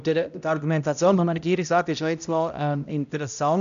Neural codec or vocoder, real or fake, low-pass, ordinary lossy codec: codec, 16 kHz, 0.5 kbps, X-Codec, HuBERT features, trained on balanced general audio; fake; 7.2 kHz; none